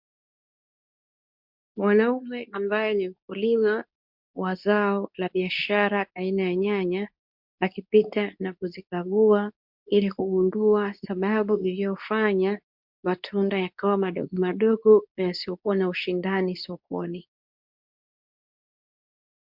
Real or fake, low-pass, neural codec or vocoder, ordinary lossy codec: fake; 5.4 kHz; codec, 24 kHz, 0.9 kbps, WavTokenizer, medium speech release version 2; MP3, 48 kbps